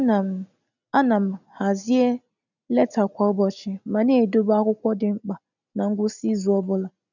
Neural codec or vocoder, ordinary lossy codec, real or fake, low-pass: none; none; real; 7.2 kHz